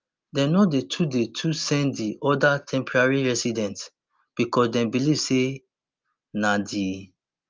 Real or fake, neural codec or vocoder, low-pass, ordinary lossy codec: real; none; 7.2 kHz; Opus, 24 kbps